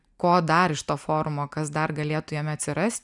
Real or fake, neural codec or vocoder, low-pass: real; none; 10.8 kHz